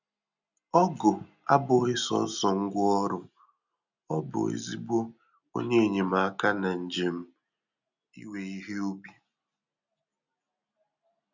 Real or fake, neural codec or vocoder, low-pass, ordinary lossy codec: real; none; 7.2 kHz; none